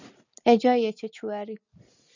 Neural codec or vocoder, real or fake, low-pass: none; real; 7.2 kHz